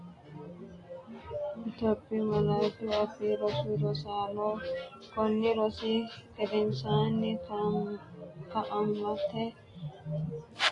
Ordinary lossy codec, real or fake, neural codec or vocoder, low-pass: AAC, 32 kbps; real; none; 10.8 kHz